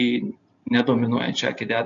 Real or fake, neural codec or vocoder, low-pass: real; none; 7.2 kHz